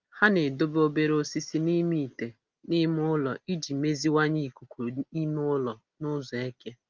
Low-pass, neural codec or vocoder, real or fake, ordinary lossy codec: 7.2 kHz; none; real; Opus, 24 kbps